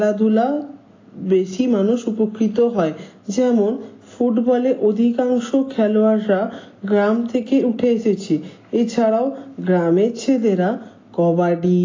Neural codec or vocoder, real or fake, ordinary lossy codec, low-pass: none; real; AAC, 32 kbps; 7.2 kHz